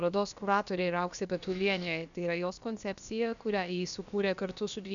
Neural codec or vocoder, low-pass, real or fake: codec, 16 kHz, about 1 kbps, DyCAST, with the encoder's durations; 7.2 kHz; fake